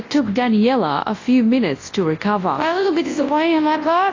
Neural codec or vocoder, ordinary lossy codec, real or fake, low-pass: codec, 24 kHz, 0.9 kbps, WavTokenizer, large speech release; AAC, 32 kbps; fake; 7.2 kHz